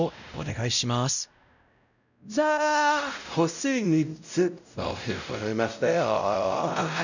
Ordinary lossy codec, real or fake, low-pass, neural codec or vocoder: none; fake; 7.2 kHz; codec, 16 kHz, 0.5 kbps, X-Codec, WavLM features, trained on Multilingual LibriSpeech